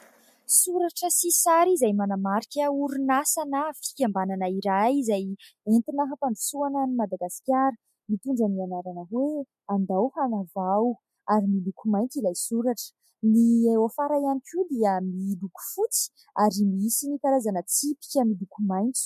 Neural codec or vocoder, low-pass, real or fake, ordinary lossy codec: none; 14.4 kHz; real; MP3, 64 kbps